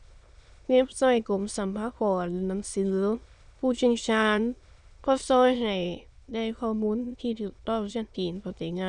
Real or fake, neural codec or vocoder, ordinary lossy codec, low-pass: fake; autoencoder, 22.05 kHz, a latent of 192 numbers a frame, VITS, trained on many speakers; none; 9.9 kHz